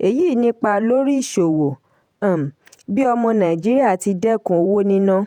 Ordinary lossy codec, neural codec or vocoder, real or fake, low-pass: none; vocoder, 48 kHz, 128 mel bands, Vocos; fake; 19.8 kHz